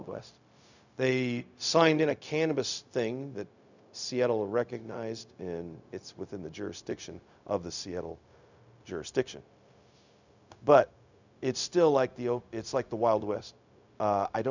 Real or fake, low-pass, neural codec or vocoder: fake; 7.2 kHz; codec, 16 kHz, 0.4 kbps, LongCat-Audio-Codec